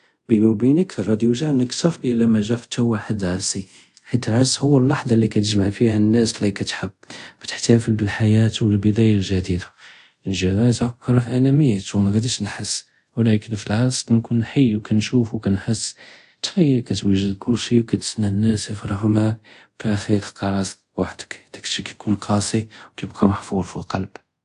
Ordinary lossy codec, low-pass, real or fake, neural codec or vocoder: AAC, 64 kbps; 10.8 kHz; fake; codec, 24 kHz, 0.5 kbps, DualCodec